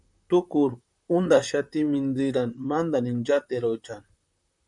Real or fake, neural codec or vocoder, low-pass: fake; vocoder, 44.1 kHz, 128 mel bands, Pupu-Vocoder; 10.8 kHz